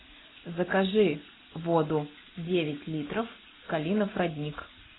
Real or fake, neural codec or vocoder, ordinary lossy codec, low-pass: real; none; AAC, 16 kbps; 7.2 kHz